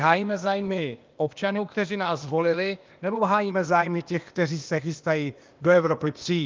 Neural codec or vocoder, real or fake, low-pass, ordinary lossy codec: codec, 16 kHz, 0.8 kbps, ZipCodec; fake; 7.2 kHz; Opus, 32 kbps